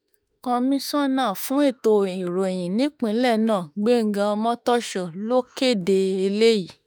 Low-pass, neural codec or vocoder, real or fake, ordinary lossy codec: none; autoencoder, 48 kHz, 32 numbers a frame, DAC-VAE, trained on Japanese speech; fake; none